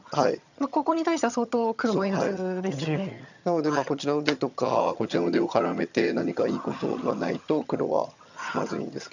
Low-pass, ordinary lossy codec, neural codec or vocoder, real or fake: 7.2 kHz; none; vocoder, 22.05 kHz, 80 mel bands, HiFi-GAN; fake